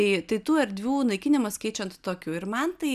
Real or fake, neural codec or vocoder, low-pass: real; none; 14.4 kHz